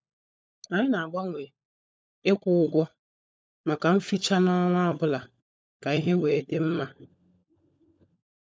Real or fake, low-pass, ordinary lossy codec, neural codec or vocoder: fake; none; none; codec, 16 kHz, 16 kbps, FunCodec, trained on LibriTTS, 50 frames a second